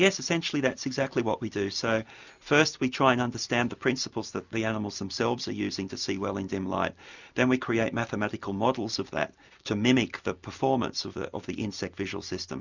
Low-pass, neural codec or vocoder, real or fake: 7.2 kHz; none; real